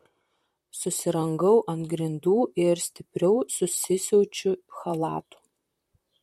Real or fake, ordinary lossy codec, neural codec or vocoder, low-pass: fake; MP3, 64 kbps; vocoder, 44.1 kHz, 128 mel bands, Pupu-Vocoder; 19.8 kHz